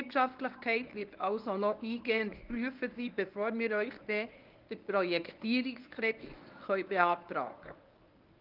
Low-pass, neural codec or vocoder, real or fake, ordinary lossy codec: 5.4 kHz; codec, 24 kHz, 0.9 kbps, WavTokenizer, medium speech release version 1; fake; Opus, 32 kbps